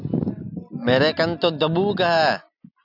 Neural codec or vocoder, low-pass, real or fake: none; 5.4 kHz; real